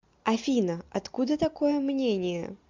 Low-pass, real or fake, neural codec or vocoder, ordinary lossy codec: 7.2 kHz; real; none; MP3, 64 kbps